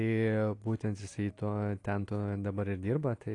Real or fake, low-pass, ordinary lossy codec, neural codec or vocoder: real; 10.8 kHz; AAC, 48 kbps; none